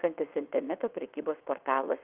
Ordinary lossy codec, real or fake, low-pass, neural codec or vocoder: Opus, 24 kbps; fake; 3.6 kHz; vocoder, 22.05 kHz, 80 mel bands, WaveNeXt